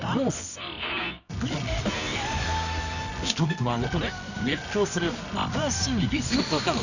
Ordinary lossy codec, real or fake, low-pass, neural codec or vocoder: none; fake; 7.2 kHz; codec, 24 kHz, 0.9 kbps, WavTokenizer, medium music audio release